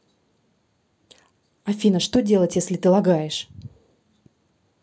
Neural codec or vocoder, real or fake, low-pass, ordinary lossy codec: none; real; none; none